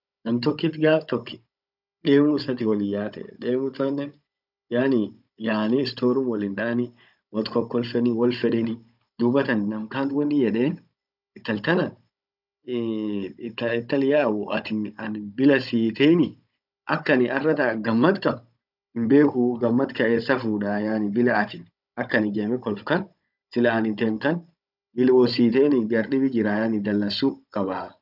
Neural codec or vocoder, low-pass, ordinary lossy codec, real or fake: codec, 16 kHz, 16 kbps, FunCodec, trained on Chinese and English, 50 frames a second; 5.4 kHz; none; fake